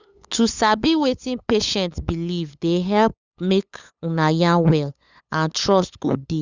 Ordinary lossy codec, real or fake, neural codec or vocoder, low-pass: Opus, 64 kbps; fake; codec, 16 kHz, 8 kbps, FunCodec, trained on LibriTTS, 25 frames a second; 7.2 kHz